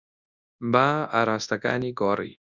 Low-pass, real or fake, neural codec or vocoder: 7.2 kHz; fake; codec, 24 kHz, 0.9 kbps, WavTokenizer, large speech release